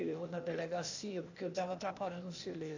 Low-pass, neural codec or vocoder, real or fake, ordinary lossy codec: 7.2 kHz; codec, 16 kHz, 0.8 kbps, ZipCodec; fake; AAC, 48 kbps